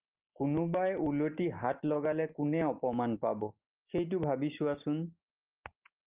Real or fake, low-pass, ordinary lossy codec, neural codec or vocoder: real; 3.6 kHz; Opus, 24 kbps; none